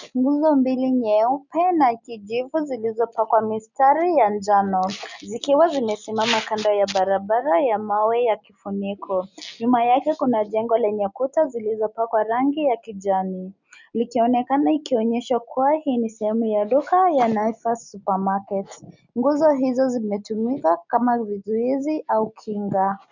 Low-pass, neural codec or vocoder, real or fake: 7.2 kHz; none; real